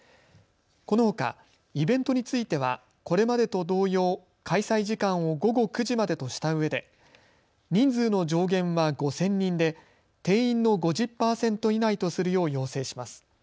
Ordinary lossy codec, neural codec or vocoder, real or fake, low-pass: none; none; real; none